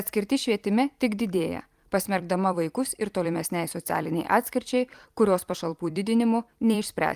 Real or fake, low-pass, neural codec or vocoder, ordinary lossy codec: fake; 14.4 kHz; vocoder, 44.1 kHz, 128 mel bands every 256 samples, BigVGAN v2; Opus, 32 kbps